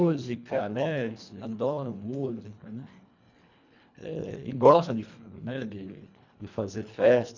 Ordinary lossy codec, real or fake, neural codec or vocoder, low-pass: none; fake; codec, 24 kHz, 1.5 kbps, HILCodec; 7.2 kHz